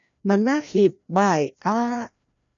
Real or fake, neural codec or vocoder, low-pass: fake; codec, 16 kHz, 1 kbps, FreqCodec, larger model; 7.2 kHz